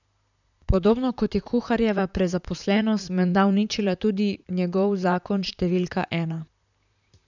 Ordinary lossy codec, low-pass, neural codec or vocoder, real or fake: none; 7.2 kHz; vocoder, 44.1 kHz, 128 mel bands, Pupu-Vocoder; fake